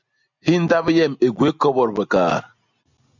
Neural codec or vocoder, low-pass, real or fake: none; 7.2 kHz; real